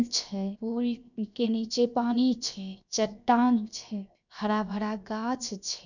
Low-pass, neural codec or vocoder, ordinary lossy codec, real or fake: 7.2 kHz; codec, 16 kHz, 0.7 kbps, FocalCodec; none; fake